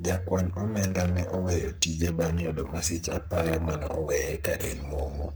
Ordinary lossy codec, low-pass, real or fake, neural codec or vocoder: none; none; fake; codec, 44.1 kHz, 3.4 kbps, Pupu-Codec